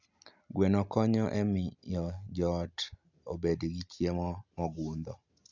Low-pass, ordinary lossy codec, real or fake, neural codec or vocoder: 7.2 kHz; none; real; none